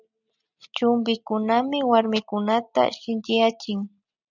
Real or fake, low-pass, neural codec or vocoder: real; 7.2 kHz; none